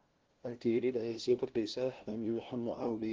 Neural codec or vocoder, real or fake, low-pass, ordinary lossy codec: codec, 16 kHz, 0.5 kbps, FunCodec, trained on LibriTTS, 25 frames a second; fake; 7.2 kHz; Opus, 16 kbps